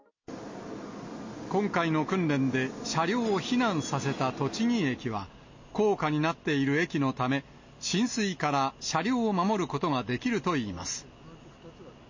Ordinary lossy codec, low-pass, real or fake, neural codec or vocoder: MP3, 32 kbps; 7.2 kHz; real; none